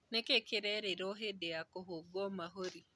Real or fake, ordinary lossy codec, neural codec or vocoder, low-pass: real; none; none; none